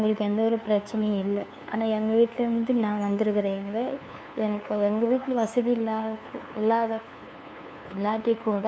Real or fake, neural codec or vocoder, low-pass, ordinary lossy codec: fake; codec, 16 kHz, 2 kbps, FunCodec, trained on LibriTTS, 25 frames a second; none; none